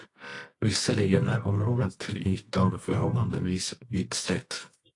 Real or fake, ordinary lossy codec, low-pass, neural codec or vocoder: fake; AAC, 48 kbps; 10.8 kHz; codec, 24 kHz, 0.9 kbps, WavTokenizer, medium music audio release